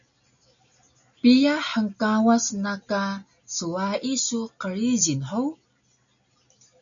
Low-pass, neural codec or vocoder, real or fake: 7.2 kHz; none; real